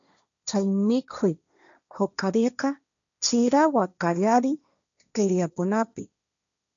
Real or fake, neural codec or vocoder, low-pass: fake; codec, 16 kHz, 1.1 kbps, Voila-Tokenizer; 7.2 kHz